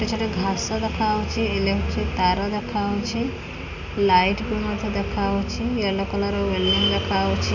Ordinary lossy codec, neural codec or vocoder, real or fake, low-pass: none; none; real; 7.2 kHz